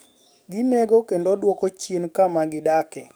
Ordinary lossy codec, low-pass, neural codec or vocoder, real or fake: none; none; codec, 44.1 kHz, 7.8 kbps, DAC; fake